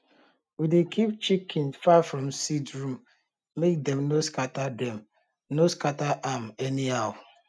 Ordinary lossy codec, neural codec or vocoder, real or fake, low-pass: none; none; real; none